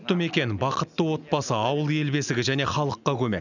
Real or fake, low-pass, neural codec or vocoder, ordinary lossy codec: real; 7.2 kHz; none; none